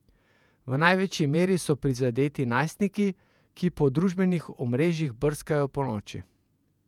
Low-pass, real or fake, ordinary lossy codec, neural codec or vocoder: 19.8 kHz; fake; none; vocoder, 48 kHz, 128 mel bands, Vocos